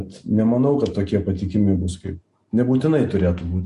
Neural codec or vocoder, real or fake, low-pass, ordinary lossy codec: none; real; 10.8 kHz; MP3, 48 kbps